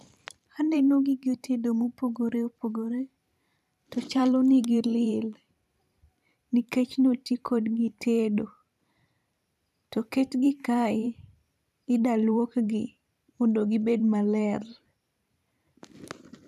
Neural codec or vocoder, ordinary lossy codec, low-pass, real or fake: vocoder, 44.1 kHz, 128 mel bands every 512 samples, BigVGAN v2; none; 14.4 kHz; fake